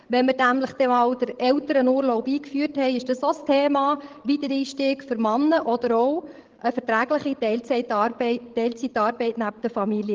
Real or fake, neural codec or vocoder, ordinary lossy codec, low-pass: fake; codec, 16 kHz, 16 kbps, FreqCodec, larger model; Opus, 16 kbps; 7.2 kHz